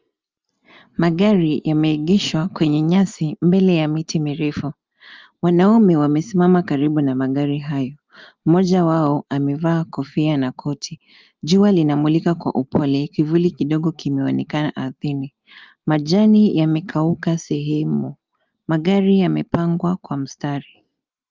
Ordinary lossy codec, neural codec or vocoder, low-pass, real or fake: Opus, 32 kbps; none; 7.2 kHz; real